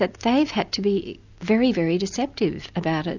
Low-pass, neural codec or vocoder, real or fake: 7.2 kHz; none; real